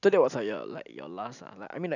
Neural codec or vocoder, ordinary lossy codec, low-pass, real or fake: none; none; 7.2 kHz; real